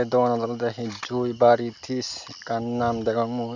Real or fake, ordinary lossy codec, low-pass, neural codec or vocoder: real; none; 7.2 kHz; none